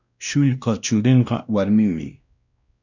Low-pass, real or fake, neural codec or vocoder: 7.2 kHz; fake; codec, 16 kHz, 1 kbps, X-Codec, WavLM features, trained on Multilingual LibriSpeech